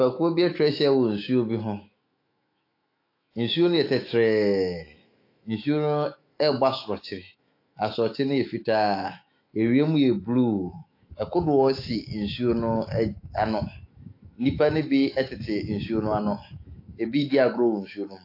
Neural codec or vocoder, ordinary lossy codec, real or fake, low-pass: autoencoder, 48 kHz, 128 numbers a frame, DAC-VAE, trained on Japanese speech; AAC, 32 kbps; fake; 5.4 kHz